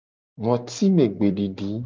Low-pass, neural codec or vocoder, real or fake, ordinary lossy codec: 7.2 kHz; none; real; Opus, 32 kbps